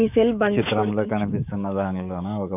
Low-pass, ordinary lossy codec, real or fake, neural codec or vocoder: 3.6 kHz; none; fake; codec, 16 kHz, 6 kbps, DAC